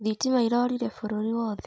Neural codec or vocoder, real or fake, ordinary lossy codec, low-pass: none; real; none; none